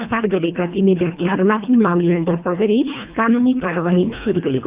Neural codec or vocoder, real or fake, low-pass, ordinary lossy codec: codec, 24 kHz, 1.5 kbps, HILCodec; fake; 3.6 kHz; Opus, 64 kbps